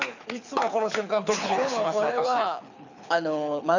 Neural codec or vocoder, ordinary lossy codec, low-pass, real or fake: codec, 24 kHz, 6 kbps, HILCodec; none; 7.2 kHz; fake